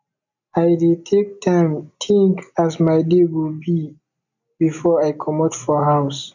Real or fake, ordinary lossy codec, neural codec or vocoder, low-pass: real; none; none; 7.2 kHz